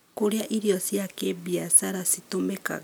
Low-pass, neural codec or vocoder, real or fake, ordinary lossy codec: none; none; real; none